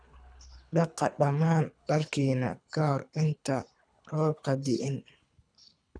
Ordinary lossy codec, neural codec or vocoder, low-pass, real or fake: none; codec, 24 kHz, 3 kbps, HILCodec; 9.9 kHz; fake